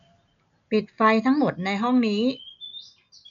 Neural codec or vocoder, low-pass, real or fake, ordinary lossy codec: none; 7.2 kHz; real; MP3, 96 kbps